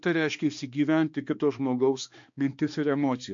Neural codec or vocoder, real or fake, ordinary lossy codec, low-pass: codec, 16 kHz, 2 kbps, X-Codec, HuBERT features, trained on balanced general audio; fake; MP3, 48 kbps; 7.2 kHz